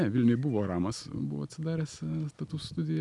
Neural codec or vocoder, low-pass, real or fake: none; 10.8 kHz; real